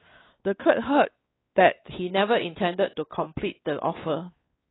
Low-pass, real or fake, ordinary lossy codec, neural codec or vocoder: 7.2 kHz; fake; AAC, 16 kbps; codec, 16 kHz, 4 kbps, X-Codec, WavLM features, trained on Multilingual LibriSpeech